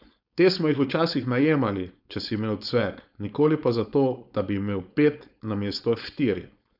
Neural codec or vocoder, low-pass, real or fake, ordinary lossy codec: codec, 16 kHz, 4.8 kbps, FACodec; 5.4 kHz; fake; none